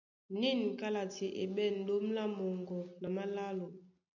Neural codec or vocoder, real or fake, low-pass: none; real; 7.2 kHz